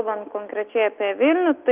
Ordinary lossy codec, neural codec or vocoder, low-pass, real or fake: Opus, 32 kbps; none; 3.6 kHz; real